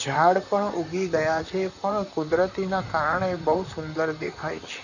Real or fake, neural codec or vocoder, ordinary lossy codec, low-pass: fake; vocoder, 44.1 kHz, 128 mel bands, Pupu-Vocoder; none; 7.2 kHz